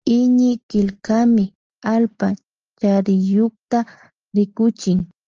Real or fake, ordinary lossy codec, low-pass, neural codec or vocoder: real; Opus, 24 kbps; 7.2 kHz; none